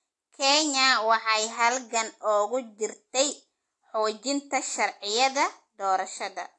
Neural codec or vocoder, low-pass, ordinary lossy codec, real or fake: none; 10.8 kHz; AAC, 48 kbps; real